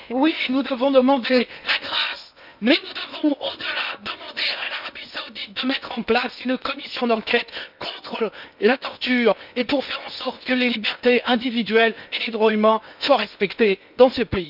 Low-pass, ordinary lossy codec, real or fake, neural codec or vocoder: 5.4 kHz; none; fake; codec, 16 kHz in and 24 kHz out, 0.8 kbps, FocalCodec, streaming, 65536 codes